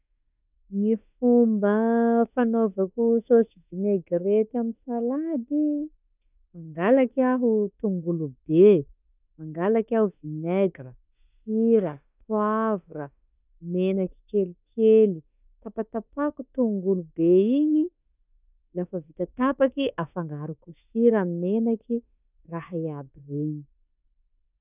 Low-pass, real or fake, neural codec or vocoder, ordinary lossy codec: 3.6 kHz; fake; autoencoder, 48 kHz, 32 numbers a frame, DAC-VAE, trained on Japanese speech; none